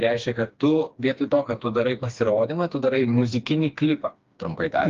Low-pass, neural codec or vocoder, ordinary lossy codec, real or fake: 7.2 kHz; codec, 16 kHz, 2 kbps, FreqCodec, smaller model; Opus, 32 kbps; fake